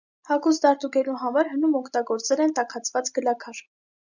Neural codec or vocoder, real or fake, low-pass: none; real; 7.2 kHz